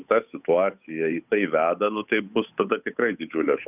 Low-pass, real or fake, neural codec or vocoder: 3.6 kHz; fake; codec, 16 kHz, 8 kbps, FunCodec, trained on Chinese and English, 25 frames a second